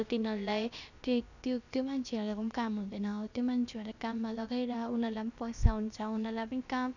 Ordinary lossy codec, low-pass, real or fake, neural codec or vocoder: none; 7.2 kHz; fake; codec, 16 kHz, about 1 kbps, DyCAST, with the encoder's durations